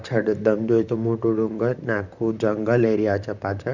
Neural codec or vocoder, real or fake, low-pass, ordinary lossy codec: vocoder, 44.1 kHz, 128 mel bands, Pupu-Vocoder; fake; 7.2 kHz; none